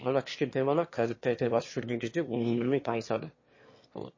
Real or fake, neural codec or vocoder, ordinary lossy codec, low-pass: fake; autoencoder, 22.05 kHz, a latent of 192 numbers a frame, VITS, trained on one speaker; MP3, 32 kbps; 7.2 kHz